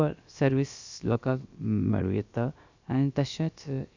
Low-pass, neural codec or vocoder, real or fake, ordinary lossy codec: 7.2 kHz; codec, 16 kHz, about 1 kbps, DyCAST, with the encoder's durations; fake; Opus, 64 kbps